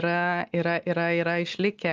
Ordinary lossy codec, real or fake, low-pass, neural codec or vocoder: Opus, 24 kbps; real; 7.2 kHz; none